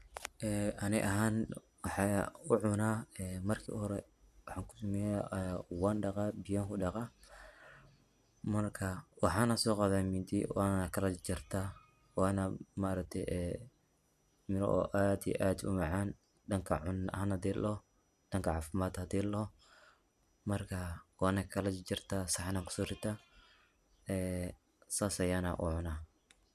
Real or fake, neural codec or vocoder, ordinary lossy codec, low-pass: real; none; none; 14.4 kHz